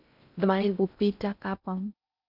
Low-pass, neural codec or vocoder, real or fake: 5.4 kHz; codec, 16 kHz in and 24 kHz out, 0.6 kbps, FocalCodec, streaming, 4096 codes; fake